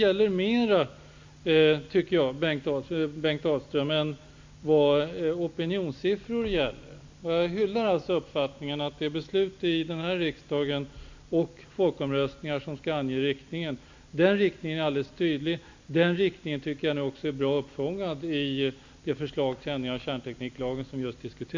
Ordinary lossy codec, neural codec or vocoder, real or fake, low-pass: MP3, 64 kbps; none; real; 7.2 kHz